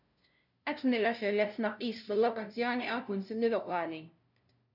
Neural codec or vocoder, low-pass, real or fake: codec, 16 kHz, 0.5 kbps, FunCodec, trained on LibriTTS, 25 frames a second; 5.4 kHz; fake